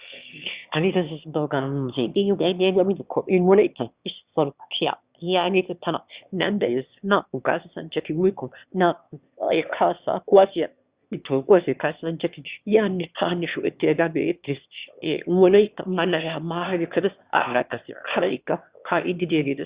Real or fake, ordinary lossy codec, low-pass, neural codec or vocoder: fake; Opus, 64 kbps; 3.6 kHz; autoencoder, 22.05 kHz, a latent of 192 numbers a frame, VITS, trained on one speaker